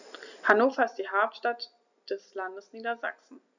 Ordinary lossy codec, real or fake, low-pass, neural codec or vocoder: none; real; 7.2 kHz; none